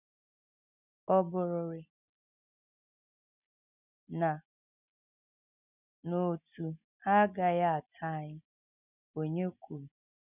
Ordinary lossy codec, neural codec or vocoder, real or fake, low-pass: none; none; real; 3.6 kHz